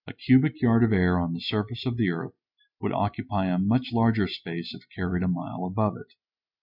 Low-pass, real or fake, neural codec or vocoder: 5.4 kHz; real; none